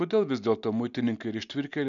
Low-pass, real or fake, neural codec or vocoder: 7.2 kHz; real; none